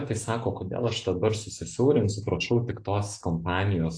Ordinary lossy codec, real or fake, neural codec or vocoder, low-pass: AAC, 48 kbps; real; none; 9.9 kHz